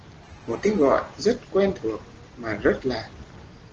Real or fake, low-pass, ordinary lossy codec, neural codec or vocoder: real; 7.2 kHz; Opus, 16 kbps; none